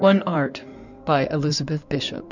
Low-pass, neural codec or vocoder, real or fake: 7.2 kHz; codec, 16 kHz in and 24 kHz out, 2.2 kbps, FireRedTTS-2 codec; fake